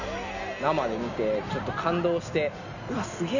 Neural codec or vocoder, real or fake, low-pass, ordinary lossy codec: none; real; 7.2 kHz; none